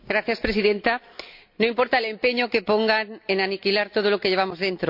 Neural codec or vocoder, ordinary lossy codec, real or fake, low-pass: none; none; real; 5.4 kHz